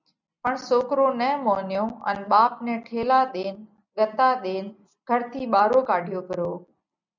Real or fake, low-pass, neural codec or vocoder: real; 7.2 kHz; none